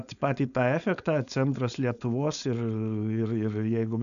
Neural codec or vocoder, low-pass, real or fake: codec, 16 kHz, 4.8 kbps, FACodec; 7.2 kHz; fake